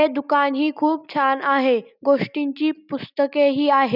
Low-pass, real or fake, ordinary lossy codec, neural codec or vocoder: 5.4 kHz; real; none; none